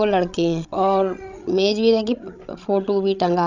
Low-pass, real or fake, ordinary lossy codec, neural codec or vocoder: 7.2 kHz; fake; none; codec, 16 kHz, 16 kbps, FreqCodec, larger model